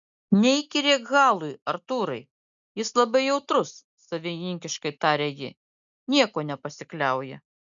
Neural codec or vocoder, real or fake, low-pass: none; real; 7.2 kHz